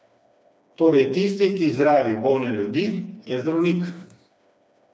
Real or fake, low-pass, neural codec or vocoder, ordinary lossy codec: fake; none; codec, 16 kHz, 2 kbps, FreqCodec, smaller model; none